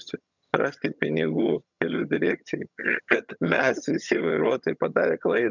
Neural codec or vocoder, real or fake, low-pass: vocoder, 22.05 kHz, 80 mel bands, HiFi-GAN; fake; 7.2 kHz